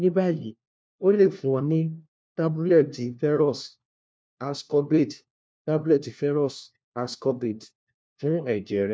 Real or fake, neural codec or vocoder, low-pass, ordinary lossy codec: fake; codec, 16 kHz, 1 kbps, FunCodec, trained on LibriTTS, 50 frames a second; none; none